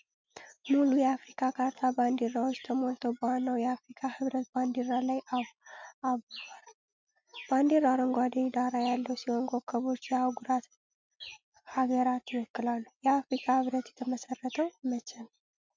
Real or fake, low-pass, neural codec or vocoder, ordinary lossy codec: real; 7.2 kHz; none; MP3, 64 kbps